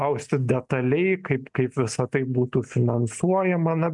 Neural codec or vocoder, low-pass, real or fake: none; 10.8 kHz; real